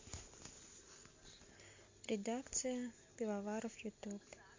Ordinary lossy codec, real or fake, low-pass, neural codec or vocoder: MP3, 48 kbps; real; 7.2 kHz; none